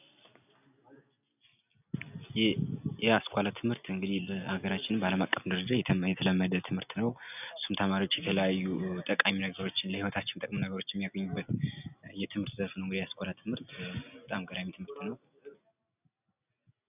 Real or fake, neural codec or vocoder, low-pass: real; none; 3.6 kHz